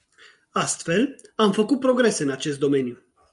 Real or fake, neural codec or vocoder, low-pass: real; none; 10.8 kHz